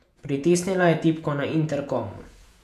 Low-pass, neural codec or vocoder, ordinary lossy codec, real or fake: 14.4 kHz; vocoder, 48 kHz, 128 mel bands, Vocos; none; fake